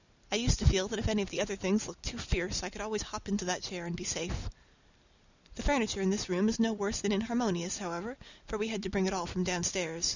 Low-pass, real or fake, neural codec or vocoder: 7.2 kHz; real; none